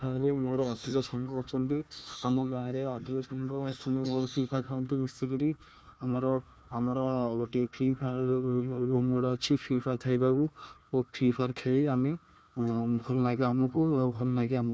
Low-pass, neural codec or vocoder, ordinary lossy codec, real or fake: none; codec, 16 kHz, 1 kbps, FunCodec, trained on Chinese and English, 50 frames a second; none; fake